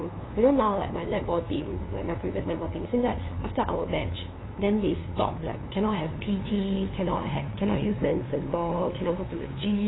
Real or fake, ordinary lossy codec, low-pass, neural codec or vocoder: fake; AAC, 16 kbps; 7.2 kHz; codec, 16 kHz, 2 kbps, FunCodec, trained on LibriTTS, 25 frames a second